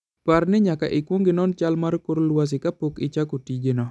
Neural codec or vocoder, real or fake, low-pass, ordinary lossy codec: none; real; none; none